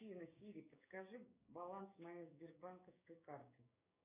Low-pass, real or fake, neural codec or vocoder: 3.6 kHz; fake; codec, 16 kHz, 6 kbps, DAC